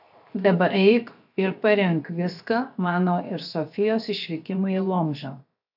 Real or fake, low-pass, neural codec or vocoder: fake; 5.4 kHz; codec, 16 kHz, 0.7 kbps, FocalCodec